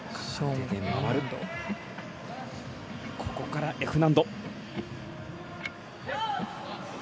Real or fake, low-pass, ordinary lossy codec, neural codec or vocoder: real; none; none; none